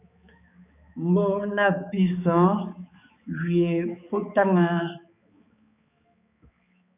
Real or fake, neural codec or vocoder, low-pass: fake; codec, 16 kHz, 4 kbps, X-Codec, HuBERT features, trained on balanced general audio; 3.6 kHz